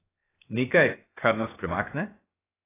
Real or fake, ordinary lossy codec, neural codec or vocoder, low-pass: fake; AAC, 16 kbps; codec, 16 kHz, 0.7 kbps, FocalCodec; 3.6 kHz